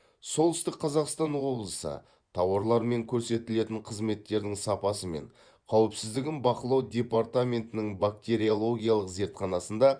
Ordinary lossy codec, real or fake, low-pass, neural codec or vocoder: Opus, 64 kbps; fake; 9.9 kHz; vocoder, 24 kHz, 100 mel bands, Vocos